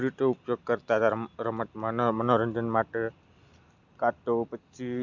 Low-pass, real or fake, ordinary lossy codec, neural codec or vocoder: 7.2 kHz; real; none; none